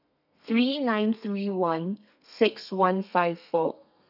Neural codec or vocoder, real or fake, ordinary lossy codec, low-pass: codec, 32 kHz, 1.9 kbps, SNAC; fake; none; 5.4 kHz